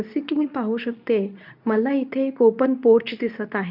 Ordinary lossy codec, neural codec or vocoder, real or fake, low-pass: none; codec, 24 kHz, 0.9 kbps, WavTokenizer, medium speech release version 2; fake; 5.4 kHz